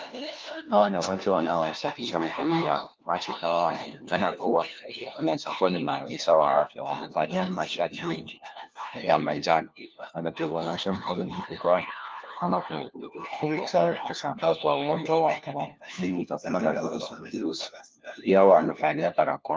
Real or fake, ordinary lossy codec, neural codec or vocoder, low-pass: fake; Opus, 24 kbps; codec, 16 kHz, 1 kbps, FunCodec, trained on LibriTTS, 50 frames a second; 7.2 kHz